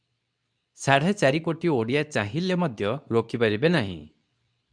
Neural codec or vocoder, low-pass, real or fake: codec, 24 kHz, 0.9 kbps, WavTokenizer, medium speech release version 2; 9.9 kHz; fake